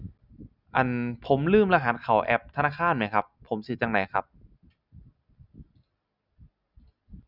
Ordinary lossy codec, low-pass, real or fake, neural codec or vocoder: none; 5.4 kHz; real; none